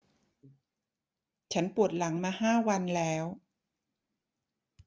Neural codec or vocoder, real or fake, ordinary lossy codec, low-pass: none; real; none; none